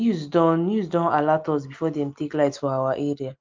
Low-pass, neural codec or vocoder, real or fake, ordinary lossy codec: 7.2 kHz; none; real; Opus, 24 kbps